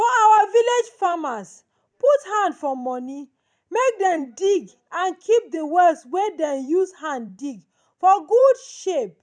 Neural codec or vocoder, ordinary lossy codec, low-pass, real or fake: vocoder, 44.1 kHz, 128 mel bands every 256 samples, BigVGAN v2; none; 9.9 kHz; fake